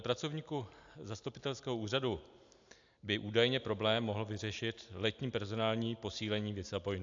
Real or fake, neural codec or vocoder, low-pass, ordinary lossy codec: real; none; 7.2 kHz; AAC, 64 kbps